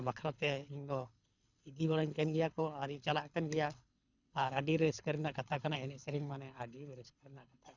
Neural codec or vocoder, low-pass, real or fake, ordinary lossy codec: codec, 24 kHz, 6 kbps, HILCodec; 7.2 kHz; fake; none